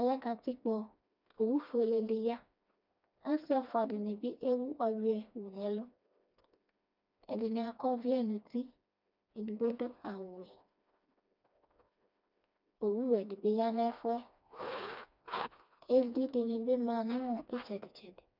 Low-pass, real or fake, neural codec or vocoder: 5.4 kHz; fake; codec, 16 kHz, 2 kbps, FreqCodec, smaller model